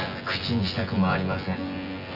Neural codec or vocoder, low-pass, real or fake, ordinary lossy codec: vocoder, 24 kHz, 100 mel bands, Vocos; 5.4 kHz; fake; none